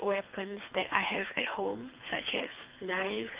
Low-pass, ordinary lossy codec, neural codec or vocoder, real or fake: 3.6 kHz; Opus, 32 kbps; codec, 24 kHz, 3 kbps, HILCodec; fake